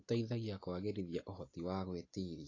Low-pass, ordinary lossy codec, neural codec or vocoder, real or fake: 7.2 kHz; AAC, 32 kbps; none; real